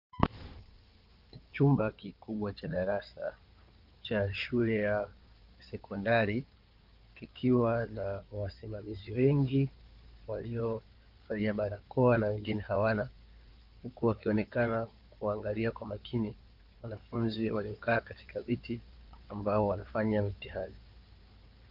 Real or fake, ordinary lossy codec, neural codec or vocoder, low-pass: fake; Opus, 32 kbps; codec, 16 kHz in and 24 kHz out, 2.2 kbps, FireRedTTS-2 codec; 5.4 kHz